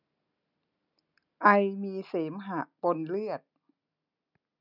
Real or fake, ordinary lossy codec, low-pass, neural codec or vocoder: real; none; 5.4 kHz; none